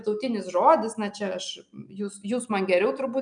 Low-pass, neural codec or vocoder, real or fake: 9.9 kHz; none; real